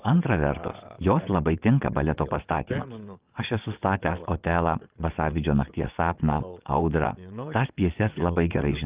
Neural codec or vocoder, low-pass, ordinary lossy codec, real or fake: vocoder, 22.05 kHz, 80 mel bands, WaveNeXt; 3.6 kHz; Opus, 32 kbps; fake